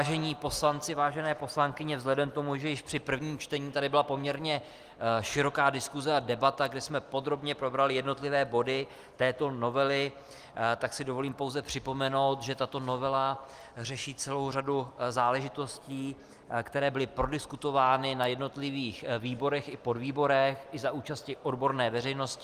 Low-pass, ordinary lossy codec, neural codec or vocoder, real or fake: 14.4 kHz; Opus, 24 kbps; none; real